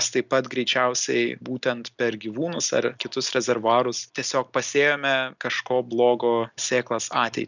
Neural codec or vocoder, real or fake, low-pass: none; real; 7.2 kHz